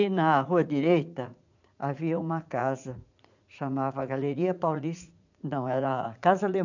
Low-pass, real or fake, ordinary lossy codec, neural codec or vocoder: 7.2 kHz; fake; none; vocoder, 44.1 kHz, 80 mel bands, Vocos